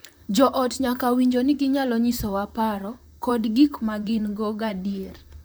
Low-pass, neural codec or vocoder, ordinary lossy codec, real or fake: none; vocoder, 44.1 kHz, 128 mel bands, Pupu-Vocoder; none; fake